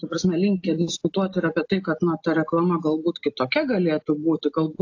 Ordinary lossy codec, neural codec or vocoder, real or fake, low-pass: AAC, 48 kbps; none; real; 7.2 kHz